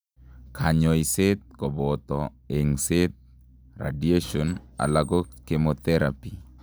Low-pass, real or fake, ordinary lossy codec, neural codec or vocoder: none; real; none; none